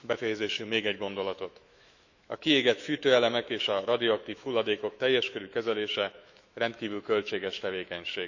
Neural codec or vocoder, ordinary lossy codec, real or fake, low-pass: autoencoder, 48 kHz, 128 numbers a frame, DAC-VAE, trained on Japanese speech; none; fake; 7.2 kHz